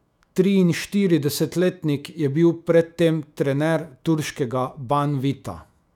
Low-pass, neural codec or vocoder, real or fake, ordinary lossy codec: 19.8 kHz; autoencoder, 48 kHz, 128 numbers a frame, DAC-VAE, trained on Japanese speech; fake; none